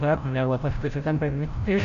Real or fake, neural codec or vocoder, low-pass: fake; codec, 16 kHz, 0.5 kbps, FreqCodec, larger model; 7.2 kHz